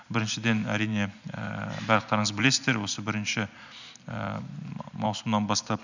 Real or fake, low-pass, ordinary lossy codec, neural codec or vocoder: real; 7.2 kHz; none; none